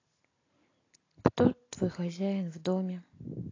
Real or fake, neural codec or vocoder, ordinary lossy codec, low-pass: real; none; AAC, 32 kbps; 7.2 kHz